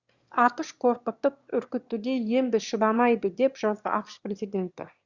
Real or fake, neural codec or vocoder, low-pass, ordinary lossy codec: fake; autoencoder, 22.05 kHz, a latent of 192 numbers a frame, VITS, trained on one speaker; 7.2 kHz; Opus, 64 kbps